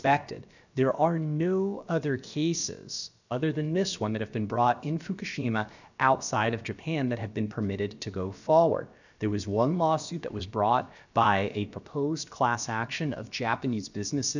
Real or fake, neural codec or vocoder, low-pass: fake; codec, 16 kHz, about 1 kbps, DyCAST, with the encoder's durations; 7.2 kHz